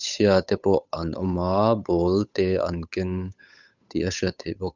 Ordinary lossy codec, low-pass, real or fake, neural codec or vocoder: none; 7.2 kHz; fake; codec, 16 kHz, 8 kbps, FunCodec, trained on Chinese and English, 25 frames a second